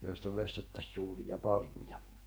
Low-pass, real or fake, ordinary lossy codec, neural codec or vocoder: none; fake; none; codec, 44.1 kHz, 2.6 kbps, SNAC